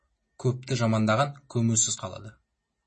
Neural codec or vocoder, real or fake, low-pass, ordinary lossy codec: none; real; 10.8 kHz; MP3, 32 kbps